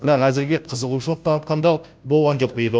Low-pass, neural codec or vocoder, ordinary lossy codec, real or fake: none; codec, 16 kHz, 0.5 kbps, FunCodec, trained on Chinese and English, 25 frames a second; none; fake